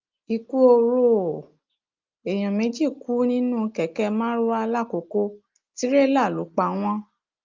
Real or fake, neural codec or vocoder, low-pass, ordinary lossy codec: real; none; 7.2 kHz; Opus, 24 kbps